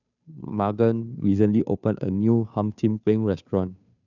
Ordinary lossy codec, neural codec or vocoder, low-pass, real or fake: none; codec, 16 kHz, 2 kbps, FunCodec, trained on Chinese and English, 25 frames a second; 7.2 kHz; fake